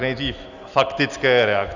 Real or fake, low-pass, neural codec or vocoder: real; 7.2 kHz; none